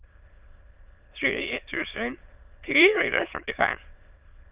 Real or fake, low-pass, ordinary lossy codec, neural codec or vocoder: fake; 3.6 kHz; Opus, 24 kbps; autoencoder, 22.05 kHz, a latent of 192 numbers a frame, VITS, trained on many speakers